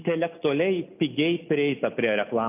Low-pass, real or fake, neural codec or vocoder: 3.6 kHz; real; none